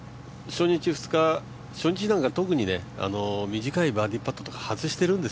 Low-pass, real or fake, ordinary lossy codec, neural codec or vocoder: none; real; none; none